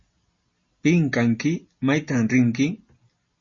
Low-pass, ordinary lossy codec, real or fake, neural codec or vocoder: 7.2 kHz; MP3, 32 kbps; real; none